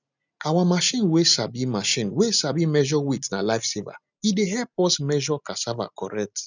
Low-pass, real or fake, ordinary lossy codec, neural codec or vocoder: 7.2 kHz; real; none; none